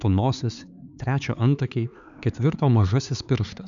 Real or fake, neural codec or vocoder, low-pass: fake; codec, 16 kHz, 4 kbps, X-Codec, HuBERT features, trained on LibriSpeech; 7.2 kHz